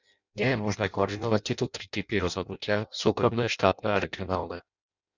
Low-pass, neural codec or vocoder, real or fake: 7.2 kHz; codec, 16 kHz in and 24 kHz out, 0.6 kbps, FireRedTTS-2 codec; fake